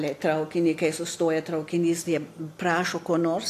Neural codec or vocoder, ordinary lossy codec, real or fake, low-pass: none; AAC, 64 kbps; real; 14.4 kHz